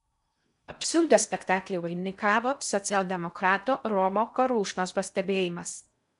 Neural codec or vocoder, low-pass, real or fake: codec, 16 kHz in and 24 kHz out, 0.6 kbps, FocalCodec, streaming, 4096 codes; 10.8 kHz; fake